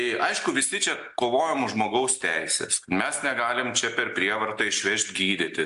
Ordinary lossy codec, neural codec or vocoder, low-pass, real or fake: AAC, 64 kbps; none; 10.8 kHz; real